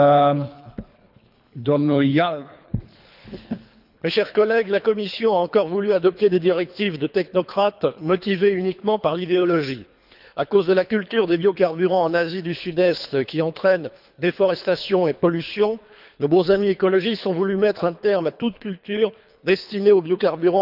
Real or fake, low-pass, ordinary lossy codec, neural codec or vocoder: fake; 5.4 kHz; none; codec, 24 kHz, 3 kbps, HILCodec